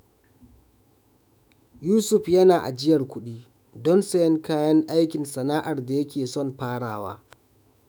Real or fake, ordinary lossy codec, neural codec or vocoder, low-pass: fake; none; autoencoder, 48 kHz, 128 numbers a frame, DAC-VAE, trained on Japanese speech; none